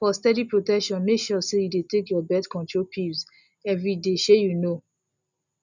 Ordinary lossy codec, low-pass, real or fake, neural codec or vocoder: none; 7.2 kHz; real; none